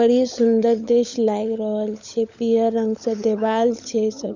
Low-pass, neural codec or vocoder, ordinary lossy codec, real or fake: 7.2 kHz; codec, 16 kHz, 16 kbps, FunCodec, trained on LibriTTS, 50 frames a second; none; fake